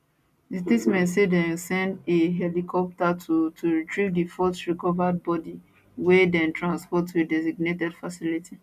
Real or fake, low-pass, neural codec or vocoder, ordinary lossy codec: real; 14.4 kHz; none; none